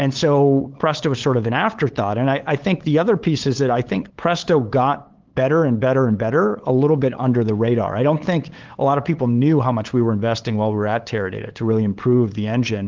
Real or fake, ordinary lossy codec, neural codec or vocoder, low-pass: fake; Opus, 16 kbps; codec, 16 kHz, 8 kbps, FunCodec, trained on LibriTTS, 25 frames a second; 7.2 kHz